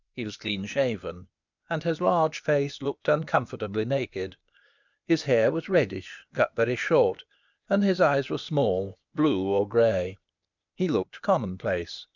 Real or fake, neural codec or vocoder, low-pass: fake; codec, 16 kHz, 0.8 kbps, ZipCodec; 7.2 kHz